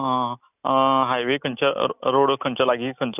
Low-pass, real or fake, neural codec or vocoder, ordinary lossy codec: 3.6 kHz; real; none; none